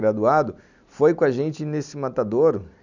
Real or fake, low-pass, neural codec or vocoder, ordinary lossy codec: real; 7.2 kHz; none; none